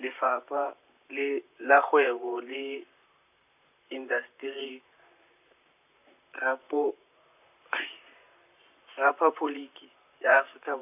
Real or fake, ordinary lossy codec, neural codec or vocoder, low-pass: fake; none; vocoder, 44.1 kHz, 128 mel bands, Pupu-Vocoder; 3.6 kHz